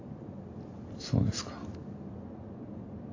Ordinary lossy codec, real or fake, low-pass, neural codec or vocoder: AAC, 32 kbps; real; 7.2 kHz; none